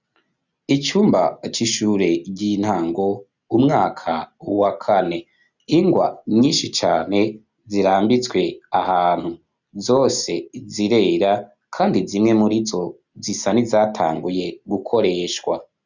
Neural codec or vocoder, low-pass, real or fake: none; 7.2 kHz; real